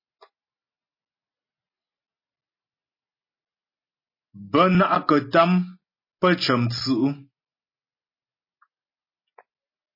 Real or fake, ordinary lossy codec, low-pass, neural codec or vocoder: real; MP3, 24 kbps; 5.4 kHz; none